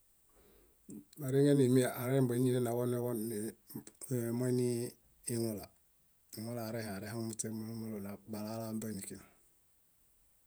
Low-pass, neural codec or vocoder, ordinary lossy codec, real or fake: none; vocoder, 48 kHz, 128 mel bands, Vocos; none; fake